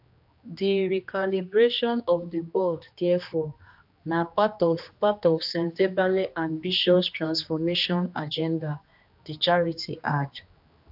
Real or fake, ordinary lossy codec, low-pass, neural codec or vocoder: fake; AAC, 48 kbps; 5.4 kHz; codec, 16 kHz, 2 kbps, X-Codec, HuBERT features, trained on general audio